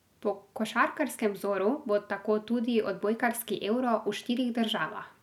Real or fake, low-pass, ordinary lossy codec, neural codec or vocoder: real; 19.8 kHz; none; none